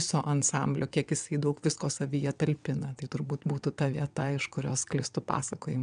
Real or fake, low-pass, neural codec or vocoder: fake; 9.9 kHz; vocoder, 22.05 kHz, 80 mel bands, WaveNeXt